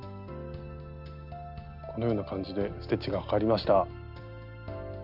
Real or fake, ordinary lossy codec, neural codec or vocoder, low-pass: real; none; none; 5.4 kHz